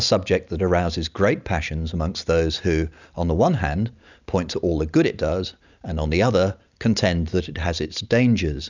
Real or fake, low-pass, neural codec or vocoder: real; 7.2 kHz; none